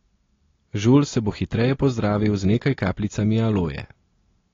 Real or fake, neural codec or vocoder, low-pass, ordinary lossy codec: real; none; 7.2 kHz; AAC, 32 kbps